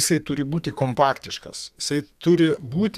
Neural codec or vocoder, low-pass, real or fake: codec, 32 kHz, 1.9 kbps, SNAC; 14.4 kHz; fake